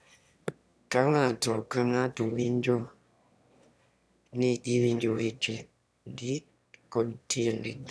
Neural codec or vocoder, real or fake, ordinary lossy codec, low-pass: autoencoder, 22.05 kHz, a latent of 192 numbers a frame, VITS, trained on one speaker; fake; none; none